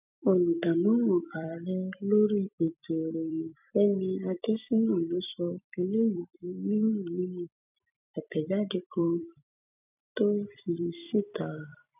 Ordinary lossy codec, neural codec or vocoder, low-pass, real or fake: none; vocoder, 44.1 kHz, 128 mel bands every 256 samples, BigVGAN v2; 3.6 kHz; fake